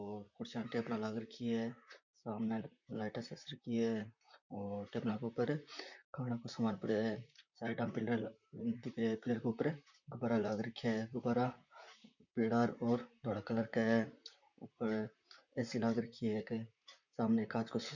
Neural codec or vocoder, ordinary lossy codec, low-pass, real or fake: vocoder, 44.1 kHz, 128 mel bands, Pupu-Vocoder; none; 7.2 kHz; fake